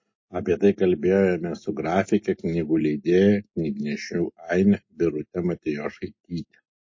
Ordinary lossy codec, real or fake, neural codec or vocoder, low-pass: MP3, 32 kbps; real; none; 7.2 kHz